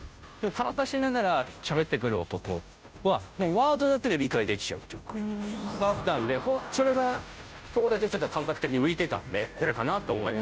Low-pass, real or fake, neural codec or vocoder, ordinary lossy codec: none; fake; codec, 16 kHz, 0.5 kbps, FunCodec, trained on Chinese and English, 25 frames a second; none